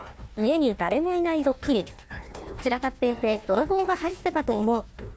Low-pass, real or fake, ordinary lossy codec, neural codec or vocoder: none; fake; none; codec, 16 kHz, 1 kbps, FunCodec, trained on Chinese and English, 50 frames a second